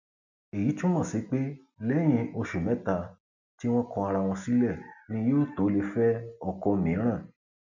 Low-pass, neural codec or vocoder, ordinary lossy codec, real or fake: 7.2 kHz; none; none; real